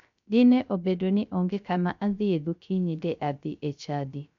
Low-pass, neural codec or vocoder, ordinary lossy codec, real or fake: 7.2 kHz; codec, 16 kHz, 0.3 kbps, FocalCodec; MP3, 64 kbps; fake